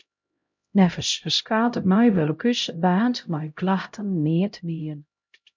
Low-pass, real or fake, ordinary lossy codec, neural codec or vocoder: 7.2 kHz; fake; MP3, 64 kbps; codec, 16 kHz, 0.5 kbps, X-Codec, HuBERT features, trained on LibriSpeech